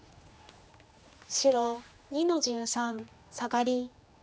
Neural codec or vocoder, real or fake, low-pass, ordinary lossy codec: codec, 16 kHz, 2 kbps, X-Codec, HuBERT features, trained on general audio; fake; none; none